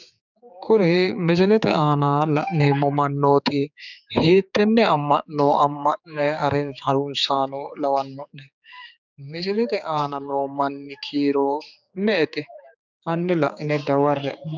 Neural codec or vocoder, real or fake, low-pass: codec, 16 kHz, 4 kbps, X-Codec, HuBERT features, trained on general audio; fake; 7.2 kHz